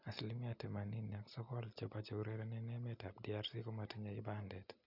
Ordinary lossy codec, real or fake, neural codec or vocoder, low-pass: none; real; none; 5.4 kHz